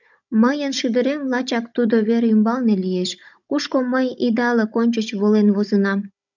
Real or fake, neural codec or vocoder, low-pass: fake; codec, 16 kHz, 16 kbps, FunCodec, trained on Chinese and English, 50 frames a second; 7.2 kHz